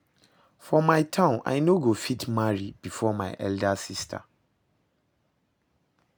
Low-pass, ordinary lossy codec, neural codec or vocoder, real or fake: none; none; none; real